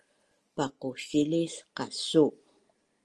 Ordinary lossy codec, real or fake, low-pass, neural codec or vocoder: Opus, 24 kbps; real; 10.8 kHz; none